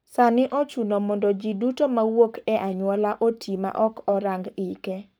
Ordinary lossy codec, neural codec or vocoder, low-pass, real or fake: none; codec, 44.1 kHz, 7.8 kbps, Pupu-Codec; none; fake